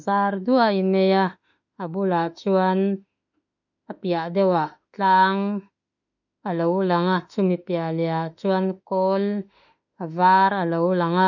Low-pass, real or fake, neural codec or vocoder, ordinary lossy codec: 7.2 kHz; fake; autoencoder, 48 kHz, 32 numbers a frame, DAC-VAE, trained on Japanese speech; none